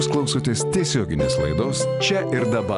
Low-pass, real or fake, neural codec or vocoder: 10.8 kHz; real; none